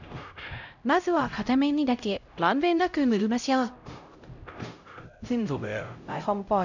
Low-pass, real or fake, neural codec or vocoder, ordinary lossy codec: 7.2 kHz; fake; codec, 16 kHz, 0.5 kbps, X-Codec, HuBERT features, trained on LibriSpeech; none